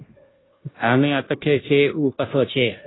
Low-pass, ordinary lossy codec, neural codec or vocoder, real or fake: 7.2 kHz; AAC, 16 kbps; codec, 16 kHz, 0.5 kbps, FunCodec, trained on Chinese and English, 25 frames a second; fake